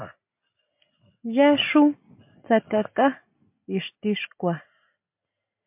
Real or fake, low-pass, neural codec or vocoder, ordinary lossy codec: real; 3.6 kHz; none; MP3, 32 kbps